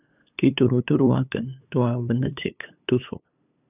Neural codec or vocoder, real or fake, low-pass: codec, 16 kHz, 8 kbps, FunCodec, trained on LibriTTS, 25 frames a second; fake; 3.6 kHz